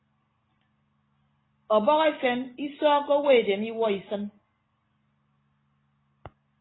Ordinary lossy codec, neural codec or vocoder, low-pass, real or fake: AAC, 16 kbps; none; 7.2 kHz; real